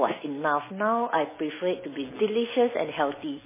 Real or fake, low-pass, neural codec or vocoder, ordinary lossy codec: real; 3.6 kHz; none; MP3, 16 kbps